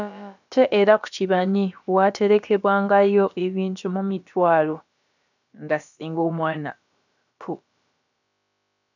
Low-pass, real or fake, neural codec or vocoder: 7.2 kHz; fake; codec, 16 kHz, about 1 kbps, DyCAST, with the encoder's durations